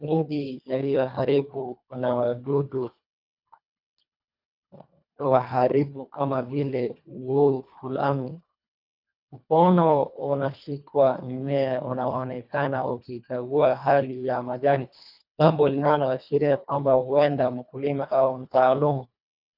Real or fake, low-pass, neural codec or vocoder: fake; 5.4 kHz; codec, 24 kHz, 1.5 kbps, HILCodec